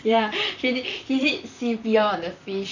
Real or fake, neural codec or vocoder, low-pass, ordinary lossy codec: fake; vocoder, 44.1 kHz, 128 mel bands, Pupu-Vocoder; 7.2 kHz; none